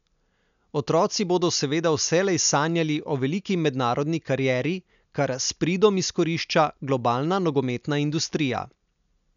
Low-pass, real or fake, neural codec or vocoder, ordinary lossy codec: 7.2 kHz; real; none; none